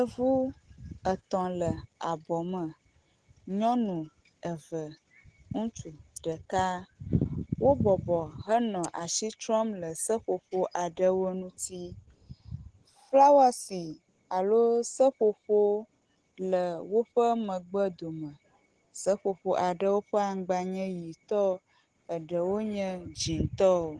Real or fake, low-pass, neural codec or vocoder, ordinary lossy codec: real; 9.9 kHz; none; Opus, 16 kbps